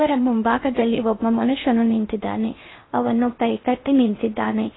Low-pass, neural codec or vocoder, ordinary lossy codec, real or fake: 7.2 kHz; codec, 16 kHz in and 24 kHz out, 0.6 kbps, FocalCodec, streaming, 4096 codes; AAC, 16 kbps; fake